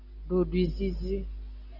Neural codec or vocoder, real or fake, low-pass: none; real; 5.4 kHz